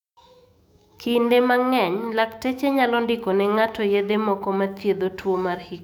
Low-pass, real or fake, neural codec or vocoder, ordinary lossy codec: 19.8 kHz; fake; autoencoder, 48 kHz, 128 numbers a frame, DAC-VAE, trained on Japanese speech; none